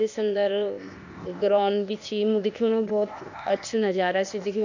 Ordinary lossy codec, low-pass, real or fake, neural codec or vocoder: none; 7.2 kHz; fake; codec, 16 kHz, 0.8 kbps, ZipCodec